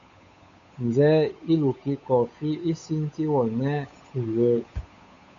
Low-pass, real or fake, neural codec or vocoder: 7.2 kHz; fake; codec, 16 kHz, 8 kbps, FunCodec, trained on Chinese and English, 25 frames a second